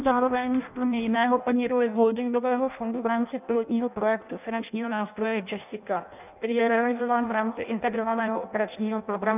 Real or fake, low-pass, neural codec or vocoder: fake; 3.6 kHz; codec, 16 kHz in and 24 kHz out, 0.6 kbps, FireRedTTS-2 codec